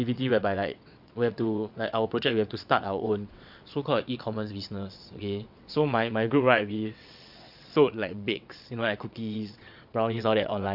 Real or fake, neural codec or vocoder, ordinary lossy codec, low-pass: fake; vocoder, 22.05 kHz, 80 mel bands, WaveNeXt; none; 5.4 kHz